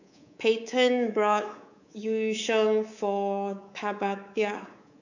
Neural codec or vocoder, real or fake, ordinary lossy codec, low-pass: codec, 24 kHz, 3.1 kbps, DualCodec; fake; none; 7.2 kHz